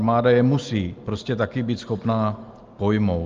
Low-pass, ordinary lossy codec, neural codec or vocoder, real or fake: 7.2 kHz; Opus, 32 kbps; none; real